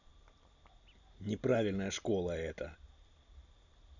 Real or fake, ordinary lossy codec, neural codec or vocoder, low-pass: real; none; none; 7.2 kHz